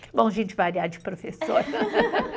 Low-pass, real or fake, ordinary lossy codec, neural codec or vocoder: none; real; none; none